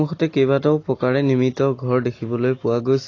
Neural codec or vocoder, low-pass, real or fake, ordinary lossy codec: none; 7.2 kHz; real; AAC, 32 kbps